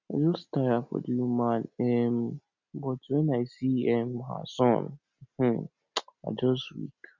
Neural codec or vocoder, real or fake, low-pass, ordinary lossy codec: none; real; 7.2 kHz; none